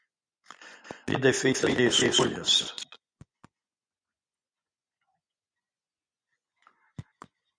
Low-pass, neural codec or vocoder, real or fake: 9.9 kHz; vocoder, 24 kHz, 100 mel bands, Vocos; fake